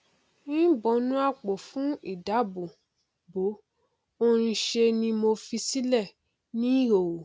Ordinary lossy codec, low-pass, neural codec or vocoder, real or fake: none; none; none; real